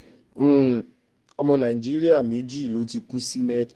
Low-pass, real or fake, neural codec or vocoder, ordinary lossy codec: 14.4 kHz; fake; codec, 44.1 kHz, 2.6 kbps, DAC; Opus, 32 kbps